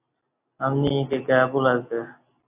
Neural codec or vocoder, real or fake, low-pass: none; real; 3.6 kHz